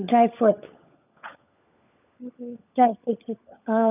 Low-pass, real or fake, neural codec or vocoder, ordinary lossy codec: 3.6 kHz; fake; codec, 16 kHz, 16 kbps, FunCodec, trained on LibriTTS, 50 frames a second; none